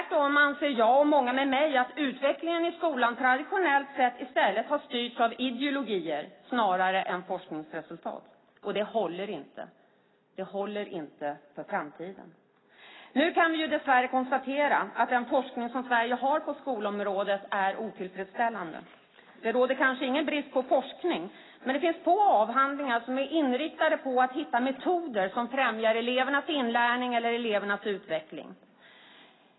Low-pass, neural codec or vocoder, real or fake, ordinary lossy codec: 7.2 kHz; none; real; AAC, 16 kbps